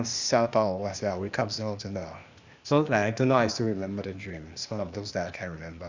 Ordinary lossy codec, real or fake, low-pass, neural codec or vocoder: Opus, 64 kbps; fake; 7.2 kHz; codec, 16 kHz, 0.8 kbps, ZipCodec